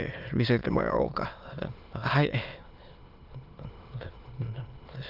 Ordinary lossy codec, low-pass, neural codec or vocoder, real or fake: Opus, 24 kbps; 5.4 kHz; autoencoder, 22.05 kHz, a latent of 192 numbers a frame, VITS, trained on many speakers; fake